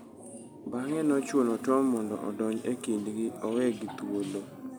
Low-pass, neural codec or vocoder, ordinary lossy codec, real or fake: none; none; none; real